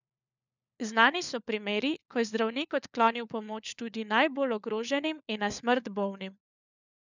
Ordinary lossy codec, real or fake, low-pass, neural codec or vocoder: none; fake; 7.2 kHz; codec, 16 kHz, 4 kbps, FunCodec, trained on LibriTTS, 50 frames a second